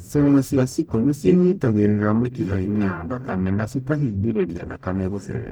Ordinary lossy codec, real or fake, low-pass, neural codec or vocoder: none; fake; none; codec, 44.1 kHz, 0.9 kbps, DAC